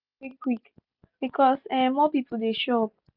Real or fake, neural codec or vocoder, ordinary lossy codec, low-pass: real; none; none; 5.4 kHz